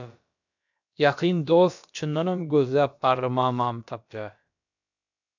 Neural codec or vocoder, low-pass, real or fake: codec, 16 kHz, about 1 kbps, DyCAST, with the encoder's durations; 7.2 kHz; fake